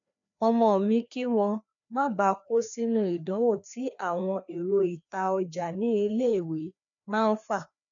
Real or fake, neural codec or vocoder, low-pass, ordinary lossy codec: fake; codec, 16 kHz, 2 kbps, FreqCodec, larger model; 7.2 kHz; none